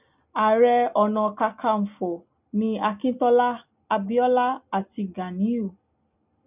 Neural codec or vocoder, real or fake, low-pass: none; real; 3.6 kHz